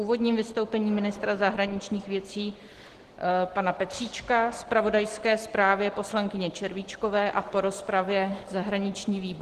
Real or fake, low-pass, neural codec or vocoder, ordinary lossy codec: real; 14.4 kHz; none; Opus, 16 kbps